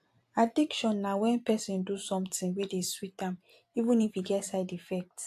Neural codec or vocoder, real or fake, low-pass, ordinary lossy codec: vocoder, 44.1 kHz, 128 mel bands every 256 samples, BigVGAN v2; fake; 14.4 kHz; AAC, 64 kbps